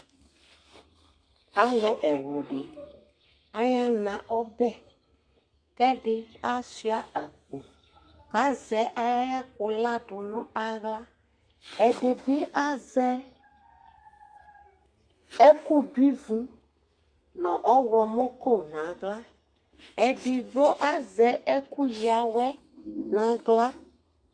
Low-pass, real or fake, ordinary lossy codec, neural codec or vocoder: 9.9 kHz; fake; Opus, 64 kbps; codec, 32 kHz, 1.9 kbps, SNAC